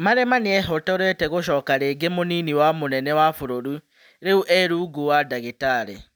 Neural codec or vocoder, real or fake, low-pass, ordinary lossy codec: none; real; none; none